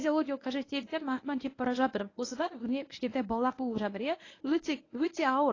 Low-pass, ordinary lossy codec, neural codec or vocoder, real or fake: 7.2 kHz; AAC, 32 kbps; codec, 24 kHz, 0.9 kbps, WavTokenizer, medium speech release version 1; fake